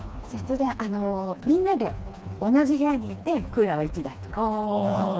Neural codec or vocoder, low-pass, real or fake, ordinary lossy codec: codec, 16 kHz, 2 kbps, FreqCodec, smaller model; none; fake; none